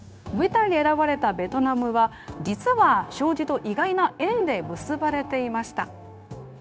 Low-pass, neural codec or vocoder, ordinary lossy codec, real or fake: none; codec, 16 kHz, 0.9 kbps, LongCat-Audio-Codec; none; fake